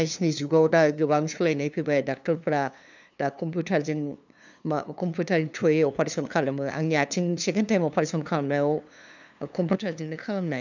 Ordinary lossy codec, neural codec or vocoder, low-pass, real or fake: none; codec, 16 kHz, 2 kbps, FunCodec, trained on LibriTTS, 25 frames a second; 7.2 kHz; fake